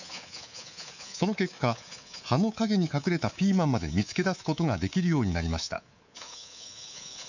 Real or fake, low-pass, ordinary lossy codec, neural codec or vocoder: fake; 7.2 kHz; none; codec, 24 kHz, 3.1 kbps, DualCodec